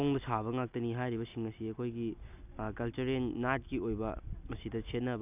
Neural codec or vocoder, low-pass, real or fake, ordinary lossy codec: none; 3.6 kHz; real; none